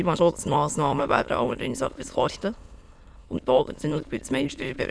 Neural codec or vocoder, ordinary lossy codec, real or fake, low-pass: autoencoder, 22.05 kHz, a latent of 192 numbers a frame, VITS, trained on many speakers; none; fake; none